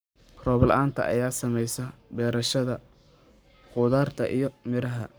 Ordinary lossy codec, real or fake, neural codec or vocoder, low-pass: none; fake; codec, 44.1 kHz, 7.8 kbps, Pupu-Codec; none